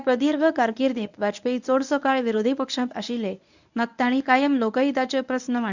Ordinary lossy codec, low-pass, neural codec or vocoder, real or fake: none; 7.2 kHz; codec, 24 kHz, 0.9 kbps, WavTokenizer, medium speech release version 1; fake